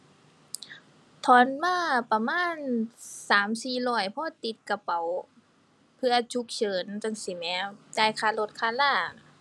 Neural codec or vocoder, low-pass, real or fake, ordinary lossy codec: none; none; real; none